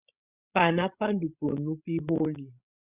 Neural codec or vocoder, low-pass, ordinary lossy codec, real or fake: codec, 16 kHz, 16 kbps, FreqCodec, larger model; 3.6 kHz; Opus, 64 kbps; fake